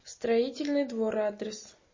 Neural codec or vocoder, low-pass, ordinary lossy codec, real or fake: none; 7.2 kHz; MP3, 32 kbps; real